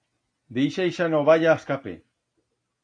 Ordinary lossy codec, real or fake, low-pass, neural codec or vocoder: AAC, 48 kbps; real; 9.9 kHz; none